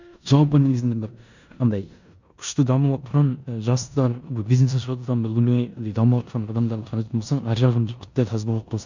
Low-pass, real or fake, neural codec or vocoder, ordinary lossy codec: 7.2 kHz; fake; codec, 16 kHz in and 24 kHz out, 0.9 kbps, LongCat-Audio-Codec, four codebook decoder; none